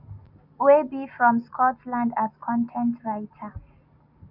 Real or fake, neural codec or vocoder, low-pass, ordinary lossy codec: real; none; 5.4 kHz; none